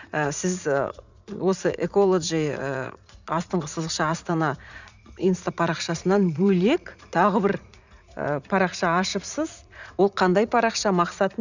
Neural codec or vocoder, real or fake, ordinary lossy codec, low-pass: vocoder, 44.1 kHz, 80 mel bands, Vocos; fake; none; 7.2 kHz